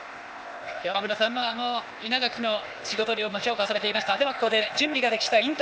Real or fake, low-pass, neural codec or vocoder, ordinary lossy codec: fake; none; codec, 16 kHz, 0.8 kbps, ZipCodec; none